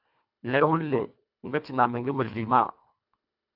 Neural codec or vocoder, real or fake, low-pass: codec, 24 kHz, 1.5 kbps, HILCodec; fake; 5.4 kHz